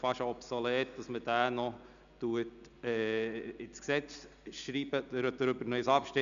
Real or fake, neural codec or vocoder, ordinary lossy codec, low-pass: real; none; none; 7.2 kHz